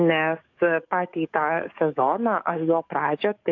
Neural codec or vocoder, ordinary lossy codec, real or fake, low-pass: codec, 44.1 kHz, 7.8 kbps, DAC; AAC, 48 kbps; fake; 7.2 kHz